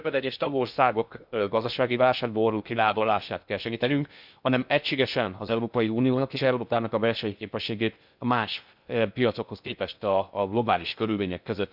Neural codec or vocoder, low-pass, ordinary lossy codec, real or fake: codec, 16 kHz in and 24 kHz out, 0.6 kbps, FocalCodec, streaming, 2048 codes; 5.4 kHz; none; fake